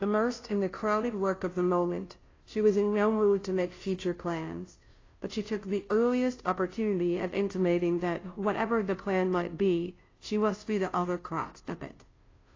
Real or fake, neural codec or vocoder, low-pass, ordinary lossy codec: fake; codec, 16 kHz, 0.5 kbps, FunCodec, trained on Chinese and English, 25 frames a second; 7.2 kHz; AAC, 32 kbps